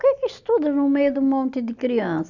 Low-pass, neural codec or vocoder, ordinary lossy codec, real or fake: 7.2 kHz; none; none; real